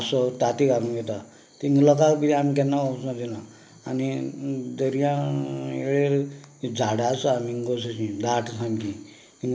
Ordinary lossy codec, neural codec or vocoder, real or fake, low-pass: none; none; real; none